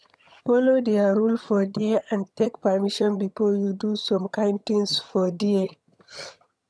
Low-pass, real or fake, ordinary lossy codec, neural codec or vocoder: none; fake; none; vocoder, 22.05 kHz, 80 mel bands, HiFi-GAN